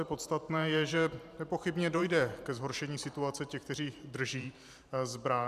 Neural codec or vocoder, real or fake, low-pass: vocoder, 44.1 kHz, 128 mel bands every 512 samples, BigVGAN v2; fake; 14.4 kHz